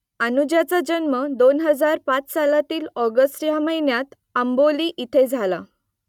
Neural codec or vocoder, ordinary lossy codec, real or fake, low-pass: none; none; real; 19.8 kHz